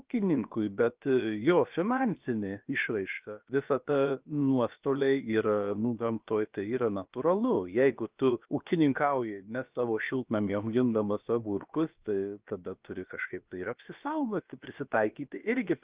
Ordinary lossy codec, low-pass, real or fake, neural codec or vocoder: Opus, 32 kbps; 3.6 kHz; fake; codec, 16 kHz, about 1 kbps, DyCAST, with the encoder's durations